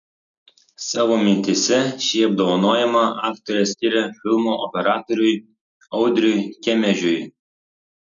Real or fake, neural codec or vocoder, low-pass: real; none; 7.2 kHz